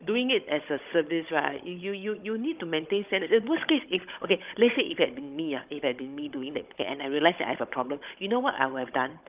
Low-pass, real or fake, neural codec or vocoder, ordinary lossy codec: 3.6 kHz; fake; codec, 16 kHz, 16 kbps, FunCodec, trained on Chinese and English, 50 frames a second; Opus, 24 kbps